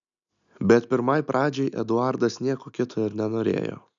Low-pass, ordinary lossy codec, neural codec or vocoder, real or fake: 7.2 kHz; MP3, 96 kbps; none; real